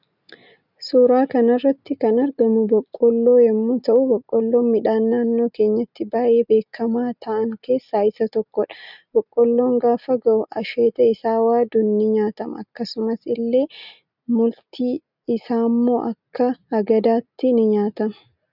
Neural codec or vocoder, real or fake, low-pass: none; real; 5.4 kHz